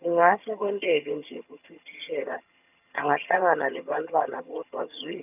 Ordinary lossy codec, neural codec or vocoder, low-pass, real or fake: none; vocoder, 22.05 kHz, 80 mel bands, HiFi-GAN; 3.6 kHz; fake